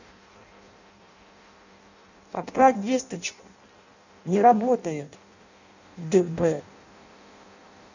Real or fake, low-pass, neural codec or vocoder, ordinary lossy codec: fake; 7.2 kHz; codec, 16 kHz in and 24 kHz out, 0.6 kbps, FireRedTTS-2 codec; none